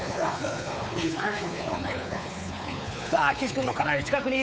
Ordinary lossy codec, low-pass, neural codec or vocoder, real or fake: none; none; codec, 16 kHz, 4 kbps, X-Codec, WavLM features, trained on Multilingual LibriSpeech; fake